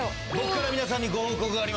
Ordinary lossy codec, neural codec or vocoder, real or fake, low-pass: none; none; real; none